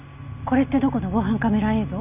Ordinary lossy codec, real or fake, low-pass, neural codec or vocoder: none; real; 3.6 kHz; none